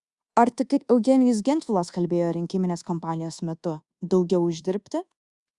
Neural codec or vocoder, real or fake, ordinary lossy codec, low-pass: codec, 24 kHz, 1.2 kbps, DualCodec; fake; Opus, 64 kbps; 10.8 kHz